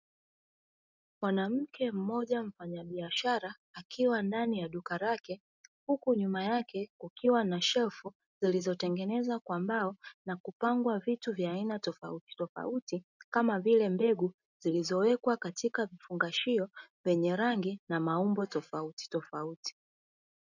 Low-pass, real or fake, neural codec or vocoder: 7.2 kHz; real; none